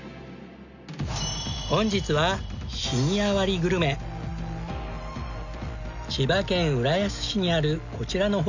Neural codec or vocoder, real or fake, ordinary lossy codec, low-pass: none; real; none; 7.2 kHz